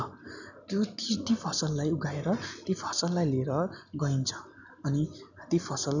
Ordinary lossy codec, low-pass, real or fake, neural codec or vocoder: none; 7.2 kHz; real; none